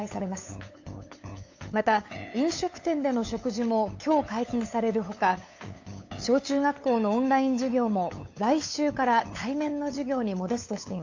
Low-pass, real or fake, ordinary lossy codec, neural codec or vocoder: 7.2 kHz; fake; AAC, 48 kbps; codec, 16 kHz, 8 kbps, FunCodec, trained on LibriTTS, 25 frames a second